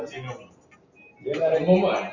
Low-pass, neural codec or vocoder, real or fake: 7.2 kHz; none; real